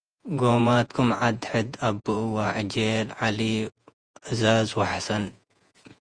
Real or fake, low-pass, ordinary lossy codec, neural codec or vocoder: fake; 9.9 kHz; Opus, 32 kbps; vocoder, 48 kHz, 128 mel bands, Vocos